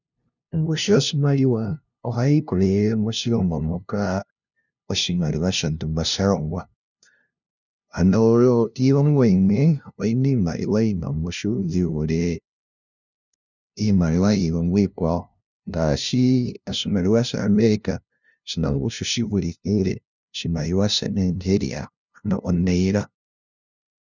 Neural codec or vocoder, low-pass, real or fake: codec, 16 kHz, 0.5 kbps, FunCodec, trained on LibriTTS, 25 frames a second; 7.2 kHz; fake